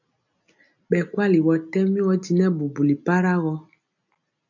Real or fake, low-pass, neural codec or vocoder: real; 7.2 kHz; none